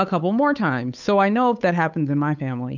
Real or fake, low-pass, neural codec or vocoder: fake; 7.2 kHz; codec, 16 kHz, 8 kbps, FunCodec, trained on Chinese and English, 25 frames a second